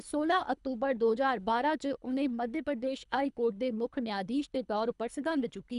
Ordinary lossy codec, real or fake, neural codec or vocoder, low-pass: none; fake; codec, 24 kHz, 3 kbps, HILCodec; 10.8 kHz